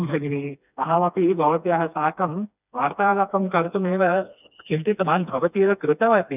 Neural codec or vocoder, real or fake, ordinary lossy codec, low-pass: codec, 16 kHz, 2 kbps, FreqCodec, smaller model; fake; none; 3.6 kHz